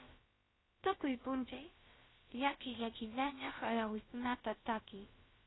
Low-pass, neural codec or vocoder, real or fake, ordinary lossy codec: 7.2 kHz; codec, 16 kHz, about 1 kbps, DyCAST, with the encoder's durations; fake; AAC, 16 kbps